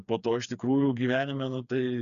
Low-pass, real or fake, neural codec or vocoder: 7.2 kHz; fake; codec, 16 kHz, 4 kbps, FreqCodec, smaller model